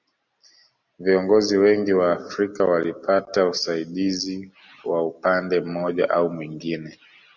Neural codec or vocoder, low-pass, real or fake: none; 7.2 kHz; real